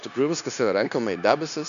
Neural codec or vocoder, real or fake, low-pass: codec, 16 kHz, 0.9 kbps, LongCat-Audio-Codec; fake; 7.2 kHz